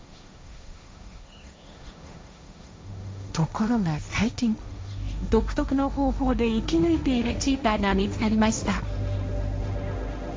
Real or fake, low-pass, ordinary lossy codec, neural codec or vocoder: fake; none; none; codec, 16 kHz, 1.1 kbps, Voila-Tokenizer